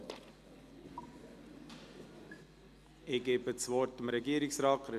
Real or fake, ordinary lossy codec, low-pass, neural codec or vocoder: real; none; 14.4 kHz; none